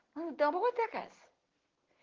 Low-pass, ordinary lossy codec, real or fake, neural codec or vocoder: 7.2 kHz; Opus, 16 kbps; real; none